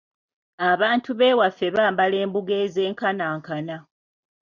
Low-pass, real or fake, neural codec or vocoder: 7.2 kHz; real; none